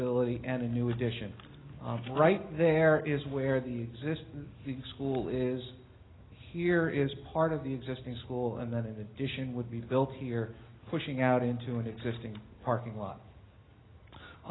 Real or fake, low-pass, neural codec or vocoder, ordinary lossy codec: real; 7.2 kHz; none; AAC, 16 kbps